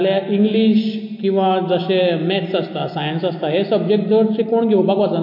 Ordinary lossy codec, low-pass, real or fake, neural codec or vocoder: MP3, 32 kbps; 5.4 kHz; real; none